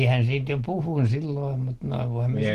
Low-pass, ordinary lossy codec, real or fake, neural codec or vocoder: 14.4 kHz; Opus, 16 kbps; real; none